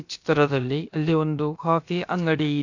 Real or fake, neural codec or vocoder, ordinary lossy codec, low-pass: fake; codec, 16 kHz, about 1 kbps, DyCAST, with the encoder's durations; AAC, 48 kbps; 7.2 kHz